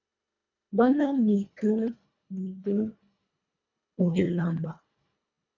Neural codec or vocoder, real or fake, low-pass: codec, 24 kHz, 1.5 kbps, HILCodec; fake; 7.2 kHz